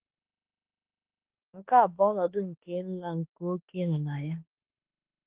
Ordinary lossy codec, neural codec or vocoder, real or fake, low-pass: Opus, 16 kbps; autoencoder, 48 kHz, 32 numbers a frame, DAC-VAE, trained on Japanese speech; fake; 3.6 kHz